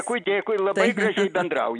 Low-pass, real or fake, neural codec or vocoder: 9.9 kHz; real; none